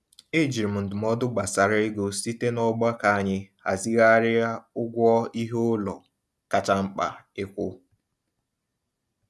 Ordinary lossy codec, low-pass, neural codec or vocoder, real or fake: none; none; none; real